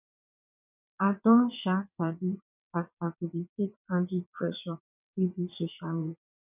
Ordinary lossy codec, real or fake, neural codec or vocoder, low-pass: none; fake; vocoder, 22.05 kHz, 80 mel bands, WaveNeXt; 3.6 kHz